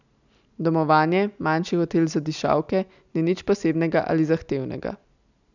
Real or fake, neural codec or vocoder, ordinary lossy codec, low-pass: real; none; none; 7.2 kHz